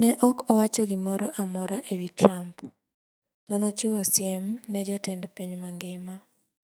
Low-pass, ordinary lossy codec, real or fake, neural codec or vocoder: none; none; fake; codec, 44.1 kHz, 2.6 kbps, SNAC